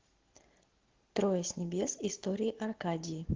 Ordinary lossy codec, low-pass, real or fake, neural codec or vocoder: Opus, 16 kbps; 7.2 kHz; real; none